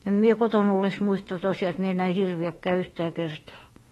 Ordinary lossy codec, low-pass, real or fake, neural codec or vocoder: AAC, 32 kbps; 19.8 kHz; fake; autoencoder, 48 kHz, 32 numbers a frame, DAC-VAE, trained on Japanese speech